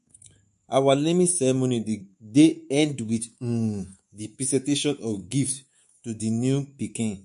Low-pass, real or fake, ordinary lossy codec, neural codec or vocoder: 10.8 kHz; fake; MP3, 48 kbps; codec, 24 kHz, 3.1 kbps, DualCodec